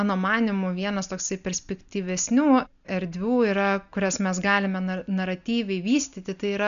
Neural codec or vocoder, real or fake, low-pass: none; real; 7.2 kHz